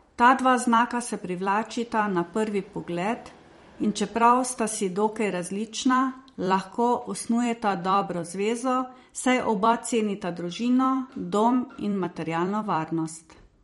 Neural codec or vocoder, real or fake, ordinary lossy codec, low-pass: vocoder, 44.1 kHz, 128 mel bands every 256 samples, BigVGAN v2; fake; MP3, 48 kbps; 19.8 kHz